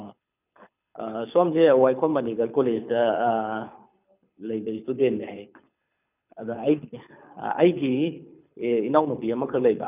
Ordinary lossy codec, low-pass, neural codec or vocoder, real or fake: none; 3.6 kHz; codec, 24 kHz, 6 kbps, HILCodec; fake